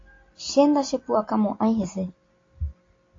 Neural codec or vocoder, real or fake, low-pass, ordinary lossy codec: none; real; 7.2 kHz; AAC, 32 kbps